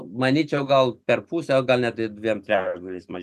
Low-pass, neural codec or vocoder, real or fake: 14.4 kHz; none; real